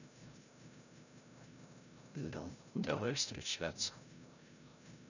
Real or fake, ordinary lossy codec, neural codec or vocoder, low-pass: fake; none; codec, 16 kHz, 0.5 kbps, FreqCodec, larger model; 7.2 kHz